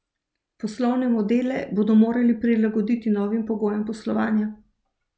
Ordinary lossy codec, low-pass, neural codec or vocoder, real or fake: none; none; none; real